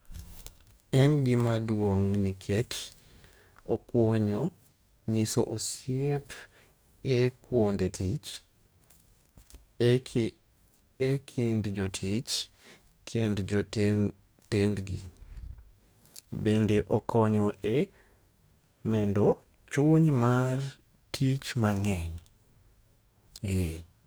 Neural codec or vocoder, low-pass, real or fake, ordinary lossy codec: codec, 44.1 kHz, 2.6 kbps, DAC; none; fake; none